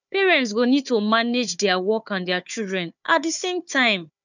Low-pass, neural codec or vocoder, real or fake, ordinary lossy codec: 7.2 kHz; codec, 16 kHz, 4 kbps, FunCodec, trained on Chinese and English, 50 frames a second; fake; none